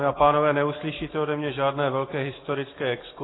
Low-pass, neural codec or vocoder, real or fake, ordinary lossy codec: 7.2 kHz; none; real; AAC, 16 kbps